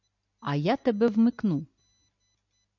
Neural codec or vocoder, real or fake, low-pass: none; real; 7.2 kHz